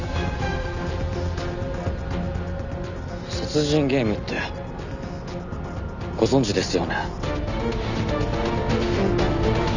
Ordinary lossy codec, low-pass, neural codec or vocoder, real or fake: none; 7.2 kHz; none; real